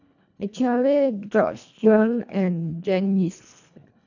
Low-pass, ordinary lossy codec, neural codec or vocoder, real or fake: 7.2 kHz; none; codec, 24 kHz, 1.5 kbps, HILCodec; fake